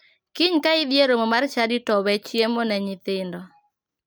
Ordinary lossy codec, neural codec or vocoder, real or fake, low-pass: none; none; real; none